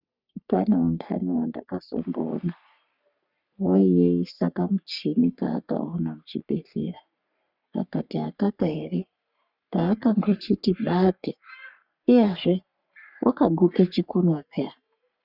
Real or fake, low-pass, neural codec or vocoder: fake; 5.4 kHz; codec, 44.1 kHz, 3.4 kbps, Pupu-Codec